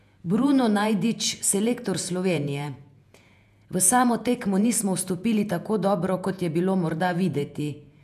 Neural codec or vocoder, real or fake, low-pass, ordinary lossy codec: vocoder, 48 kHz, 128 mel bands, Vocos; fake; 14.4 kHz; none